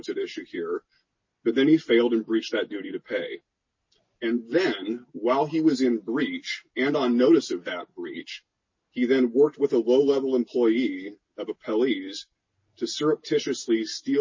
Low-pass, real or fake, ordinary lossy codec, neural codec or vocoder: 7.2 kHz; real; MP3, 32 kbps; none